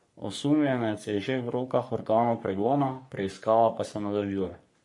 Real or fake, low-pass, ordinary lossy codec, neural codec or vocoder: fake; 10.8 kHz; MP3, 48 kbps; codec, 44.1 kHz, 3.4 kbps, Pupu-Codec